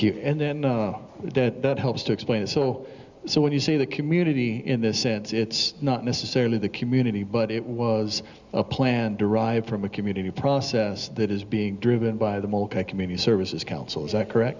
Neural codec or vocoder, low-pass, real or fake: none; 7.2 kHz; real